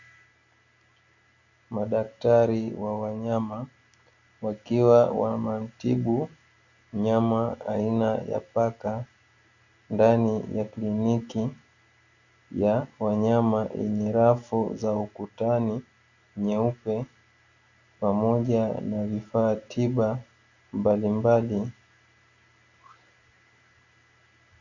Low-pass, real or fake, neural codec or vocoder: 7.2 kHz; real; none